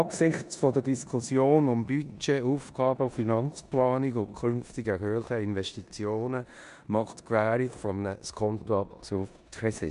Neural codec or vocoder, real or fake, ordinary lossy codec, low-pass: codec, 16 kHz in and 24 kHz out, 0.9 kbps, LongCat-Audio-Codec, four codebook decoder; fake; none; 10.8 kHz